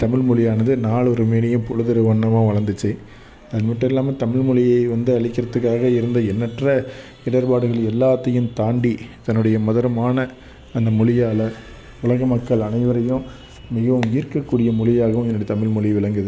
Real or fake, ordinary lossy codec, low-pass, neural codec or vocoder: real; none; none; none